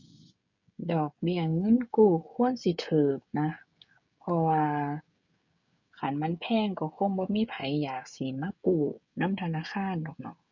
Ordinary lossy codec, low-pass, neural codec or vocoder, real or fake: none; 7.2 kHz; codec, 16 kHz, 16 kbps, FreqCodec, smaller model; fake